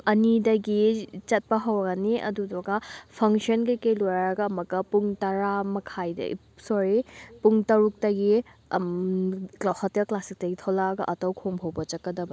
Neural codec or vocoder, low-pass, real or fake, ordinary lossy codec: none; none; real; none